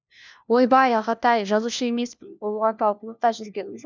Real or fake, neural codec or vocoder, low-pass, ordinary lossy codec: fake; codec, 16 kHz, 1 kbps, FunCodec, trained on LibriTTS, 50 frames a second; none; none